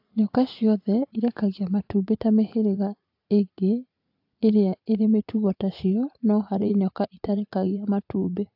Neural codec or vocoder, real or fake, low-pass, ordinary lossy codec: vocoder, 44.1 kHz, 80 mel bands, Vocos; fake; 5.4 kHz; AAC, 48 kbps